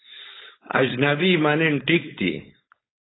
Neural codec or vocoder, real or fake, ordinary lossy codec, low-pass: codec, 16 kHz, 4.8 kbps, FACodec; fake; AAC, 16 kbps; 7.2 kHz